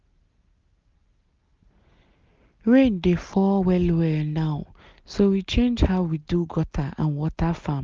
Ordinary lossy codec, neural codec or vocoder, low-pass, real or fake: Opus, 32 kbps; none; 7.2 kHz; real